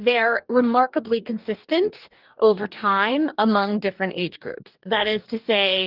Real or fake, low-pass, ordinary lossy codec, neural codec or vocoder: fake; 5.4 kHz; Opus, 24 kbps; codec, 44.1 kHz, 2.6 kbps, DAC